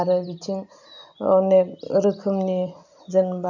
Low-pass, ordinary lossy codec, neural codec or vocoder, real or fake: 7.2 kHz; none; none; real